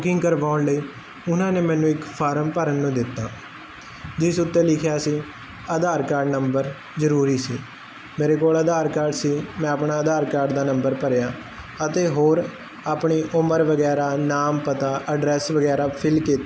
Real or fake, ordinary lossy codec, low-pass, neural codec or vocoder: real; none; none; none